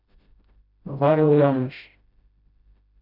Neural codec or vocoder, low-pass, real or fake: codec, 16 kHz, 0.5 kbps, FreqCodec, smaller model; 5.4 kHz; fake